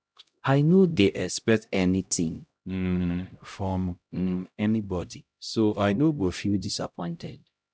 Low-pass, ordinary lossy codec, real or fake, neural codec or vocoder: none; none; fake; codec, 16 kHz, 0.5 kbps, X-Codec, HuBERT features, trained on LibriSpeech